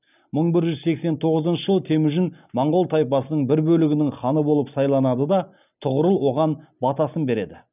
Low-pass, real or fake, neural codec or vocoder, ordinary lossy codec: 3.6 kHz; real; none; none